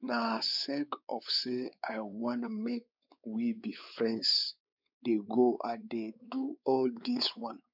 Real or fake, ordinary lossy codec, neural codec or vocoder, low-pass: fake; none; codec, 16 kHz, 4 kbps, X-Codec, WavLM features, trained on Multilingual LibriSpeech; 5.4 kHz